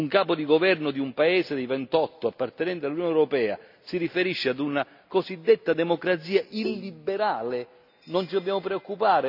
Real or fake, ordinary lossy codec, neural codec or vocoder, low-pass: real; none; none; 5.4 kHz